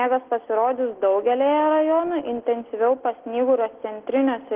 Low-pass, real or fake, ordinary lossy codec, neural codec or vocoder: 3.6 kHz; real; Opus, 16 kbps; none